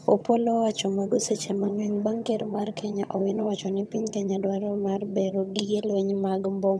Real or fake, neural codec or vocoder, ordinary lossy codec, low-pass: fake; vocoder, 22.05 kHz, 80 mel bands, HiFi-GAN; none; none